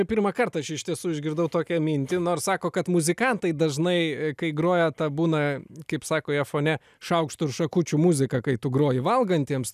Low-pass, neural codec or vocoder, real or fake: 14.4 kHz; none; real